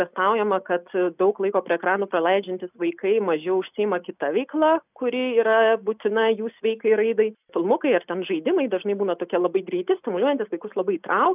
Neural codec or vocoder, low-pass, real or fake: none; 3.6 kHz; real